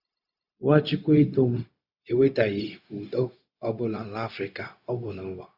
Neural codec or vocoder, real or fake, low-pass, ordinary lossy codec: codec, 16 kHz, 0.4 kbps, LongCat-Audio-Codec; fake; 5.4 kHz; Opus, 64 kbps